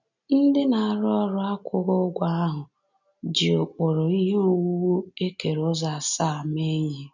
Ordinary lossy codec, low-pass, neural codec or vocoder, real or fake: none; 7.2 kHz; none; real